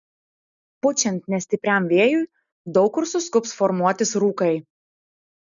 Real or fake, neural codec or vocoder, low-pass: real; none; 7.2 kHz